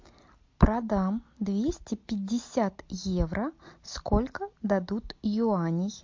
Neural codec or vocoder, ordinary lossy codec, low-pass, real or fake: none; MP3, 48 kbps; 7.2 kHz; real